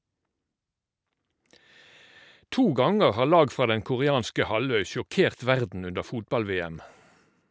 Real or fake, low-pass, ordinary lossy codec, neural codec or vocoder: real; none; none; none